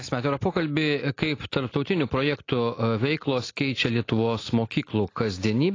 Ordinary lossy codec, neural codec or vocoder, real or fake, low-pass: AAC, 32 kbps; none; real; 7.2 kHz